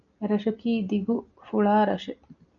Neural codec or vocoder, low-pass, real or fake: none; 7.2 kHz; real